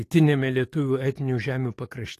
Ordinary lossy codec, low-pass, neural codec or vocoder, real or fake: AAC, 48 kbps; 14.4 kHz; codec, 44.1 kHz, 7.8 kbps, DAC; fake